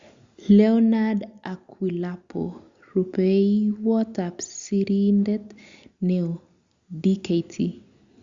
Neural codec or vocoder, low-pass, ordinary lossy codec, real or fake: none; 7.2 kHz; Opus, 64 kbps; real